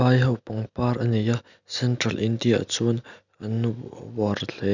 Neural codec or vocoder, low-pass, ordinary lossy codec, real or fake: none; 7.2 kHz; none; real